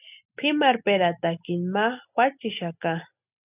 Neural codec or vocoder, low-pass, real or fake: none; 3.6 kHz; real